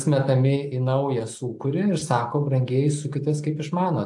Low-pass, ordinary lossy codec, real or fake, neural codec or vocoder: 10.8 kHz; AAC, 64 kbps; real; none